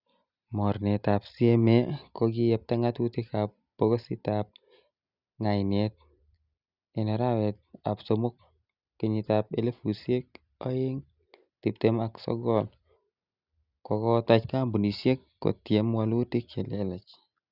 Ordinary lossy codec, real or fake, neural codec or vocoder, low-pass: Opus, 64 kbps; real; none; 5.4 kHz